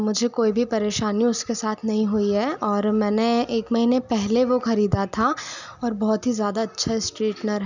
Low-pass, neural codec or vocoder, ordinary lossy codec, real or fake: 7.2 kHz; none; none; real